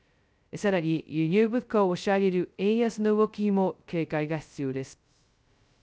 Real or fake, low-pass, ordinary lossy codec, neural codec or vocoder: fake; none; none; codec, 16 kHz, 0.2 kbps, FocalCodec